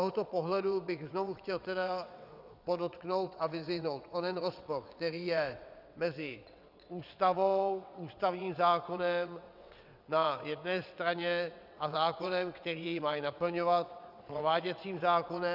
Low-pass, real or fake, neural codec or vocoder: 5.4 kHz; fake; vocoder, 22.05 kHz, 80 mel bands, WaveNeXt